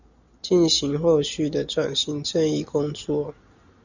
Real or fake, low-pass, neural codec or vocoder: real; 7.2 kHz; none